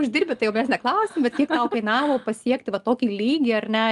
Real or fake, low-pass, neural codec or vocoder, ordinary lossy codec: real; 10.8 kHz; none; Opus, 32 kbps